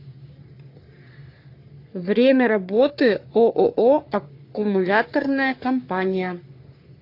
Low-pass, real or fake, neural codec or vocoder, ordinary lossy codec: 5.4 kHz; fake; codec, 44.1 kHz, 3.4 kbps, Pupu-Codec; AAC, 32 kbps